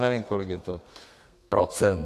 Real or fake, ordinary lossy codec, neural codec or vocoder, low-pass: fake; AAC, 64 kbps; codec, 32 kHz, 1.9 kbps, SNAC; 14.4 kHz